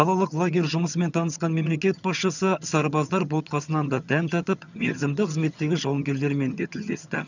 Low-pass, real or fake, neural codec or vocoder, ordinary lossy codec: 7.2 kHz; fake; vocoder, 22.05 kHz, 80 mel bands, HiFi-GAN; none